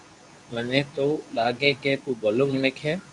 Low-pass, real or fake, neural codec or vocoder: 10.8 kHz; fake; codec, 24 kHz, 0.9 kbps, WavTokenizer, medium speech release version 2